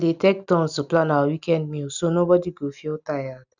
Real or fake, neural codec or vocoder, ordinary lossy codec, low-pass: real; none; none; 7.2 kHz